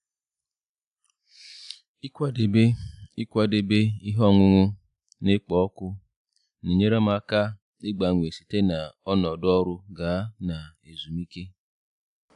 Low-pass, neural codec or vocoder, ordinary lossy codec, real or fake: 10.8 kHz; none; AAC, 96 kbps; real